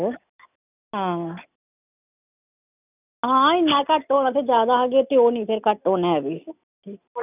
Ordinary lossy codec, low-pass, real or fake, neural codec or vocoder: none; 3.6 kHz; real; none